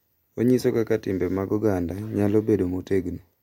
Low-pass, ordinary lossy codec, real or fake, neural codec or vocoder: 19.8 kHz; MP3, 64 kbps; fake; vocoder, 44.1 kHz, 128 mel bands every 256 samples, BigVGAN v2